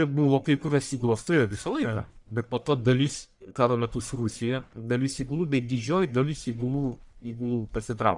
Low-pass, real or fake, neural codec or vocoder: 10.8 kHz; fake; codec, 44.1 kHz, 1.7 kbps, Pupu-Codec